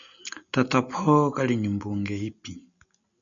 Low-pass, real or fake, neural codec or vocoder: 7.2 kHz; real; none